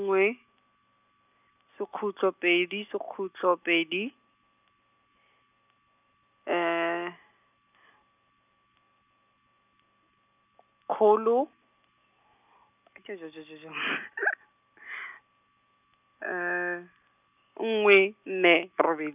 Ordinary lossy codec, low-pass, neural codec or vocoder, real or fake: none; 3.6 kHz; none; real